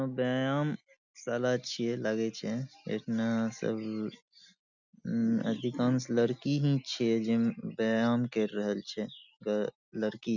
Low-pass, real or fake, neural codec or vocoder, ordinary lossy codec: 7.2 kHz; real; none; none